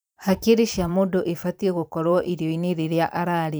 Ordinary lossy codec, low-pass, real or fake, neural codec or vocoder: none; none; fake; vocoder, 44.1 kHz, 128 mel bands every 512 samples, BigVGAN v2